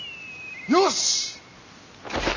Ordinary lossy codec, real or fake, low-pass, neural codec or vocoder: none; real; 7.2 kHz; none